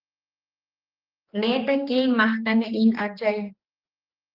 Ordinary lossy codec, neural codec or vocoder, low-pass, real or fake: Opus, 24 kbps; codec, 16 kHz, 2 kbps, X-Codec, HuBERT features, trained on general audio; 5.4 kHz; fake